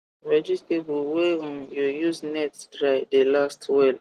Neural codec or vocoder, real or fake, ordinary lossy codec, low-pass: none; real; Opus, 24 kbps; 14.4 kHz